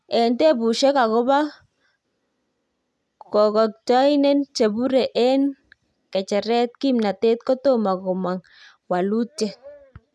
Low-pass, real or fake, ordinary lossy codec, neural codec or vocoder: none; real; none; none